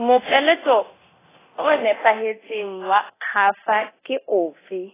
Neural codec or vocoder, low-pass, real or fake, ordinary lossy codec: codec, 24 kHz, 0.9 kbps, DualCodec; 3.6 kHz; fake; AAC, 16 kbps